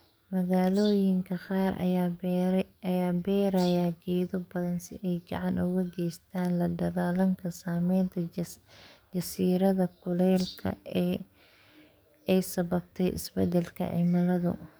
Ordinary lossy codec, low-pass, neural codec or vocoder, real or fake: none; none; codec, 44.1 kHz, 7.8 kbps, DAC; fake